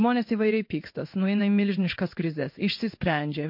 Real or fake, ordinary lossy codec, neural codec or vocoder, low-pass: fake; MP3, 32 kbps; codec, 16 kHz in and 24 kHz out, 1 kbps, XY-Tokenizer; 5.4 kHz